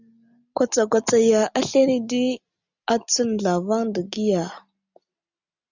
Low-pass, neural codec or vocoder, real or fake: 7.2 kHz; none; real